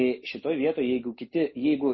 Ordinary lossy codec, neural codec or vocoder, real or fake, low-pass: MP3, 24 kbps; none; real; 7.2 kHz